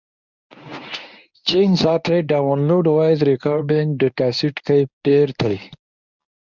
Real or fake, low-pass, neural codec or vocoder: fake; 7.2 kHz; codec, 24 kHz, 0.9 kbps, WavTokenizer, medium speech release version 1